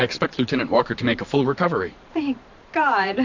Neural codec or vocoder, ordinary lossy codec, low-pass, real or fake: vocoder, 44.1 kHz, 128 mel bands every 512 samples, BigVGAN v2; AAC, 48 kbps; 7.2 kHz; fake